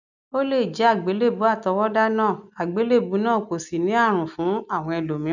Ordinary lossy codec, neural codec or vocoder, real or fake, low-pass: none; none; real; 7.2 kHz